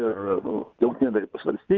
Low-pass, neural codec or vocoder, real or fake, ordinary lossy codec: 7.2 kHz; vocoder, 24 kHz, 100 mel bands, Vocos; fake; Opus, 32 kbps